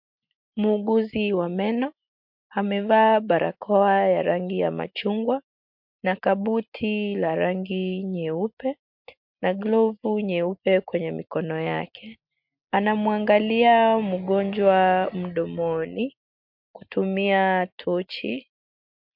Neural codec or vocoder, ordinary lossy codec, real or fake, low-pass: none; MP3, 48 kbps; real; 5.4 kHz